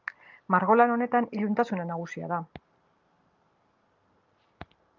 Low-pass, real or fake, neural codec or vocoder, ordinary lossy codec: 7.2 kHz; real; none; Opus, 24 kbps